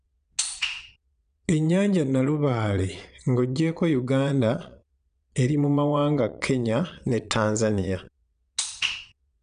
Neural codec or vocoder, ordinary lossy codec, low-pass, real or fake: vocoder, 22.05 kHz, 80 mel bands, Vocos; none; 9.9 kHz; fake